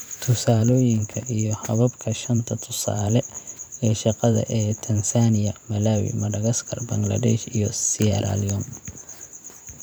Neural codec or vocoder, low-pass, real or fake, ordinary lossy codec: vocoder, 44.1 kHz, 128 mel bands every 512 samples, BigVGAN v2; none; fake; none